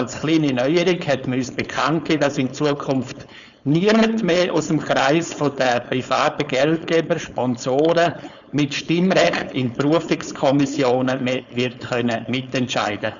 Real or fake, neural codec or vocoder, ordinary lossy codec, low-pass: fake; codec, 16 kHz, 4.8 kbps, FACodec; none; 7.2 kHz